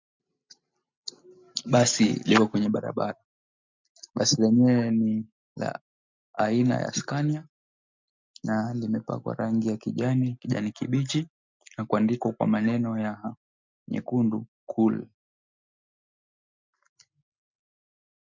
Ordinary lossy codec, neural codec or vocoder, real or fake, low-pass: MP3, 64 kbps; none; real; 7.2 kHz